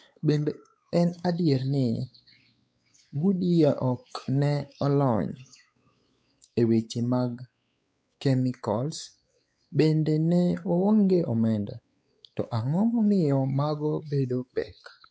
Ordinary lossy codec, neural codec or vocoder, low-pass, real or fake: none; codec, 16 kHz, 4 kbps, X-Codec, WavLM features, trained on Multilingual LibriSpeech; none; fake